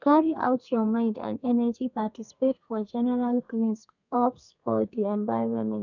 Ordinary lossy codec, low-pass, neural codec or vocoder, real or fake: none; 7.2 kHz; codec, 32 kHz, 1.9 kbps, SNAC; fake